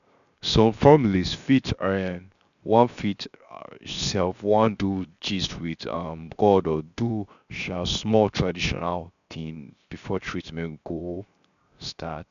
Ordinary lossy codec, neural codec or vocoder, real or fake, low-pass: none; codec, 16 kHz, 0.7 kbps, FocalCodec; fake; 7.2 kHz